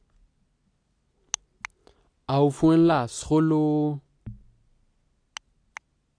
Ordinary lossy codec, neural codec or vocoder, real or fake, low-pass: AAC, 48 kbps; none; real; 9.9 kHz